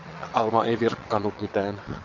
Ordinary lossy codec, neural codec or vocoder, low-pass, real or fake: AAC, 48 kbps; vocoder, 22.05 kHz, 80 mel bands, WaveNeXt; 7.2 kHz; fake